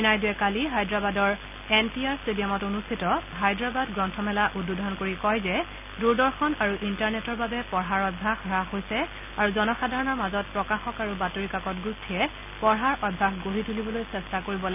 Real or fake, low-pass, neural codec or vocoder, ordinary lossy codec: real; 3.6 kHz; none; none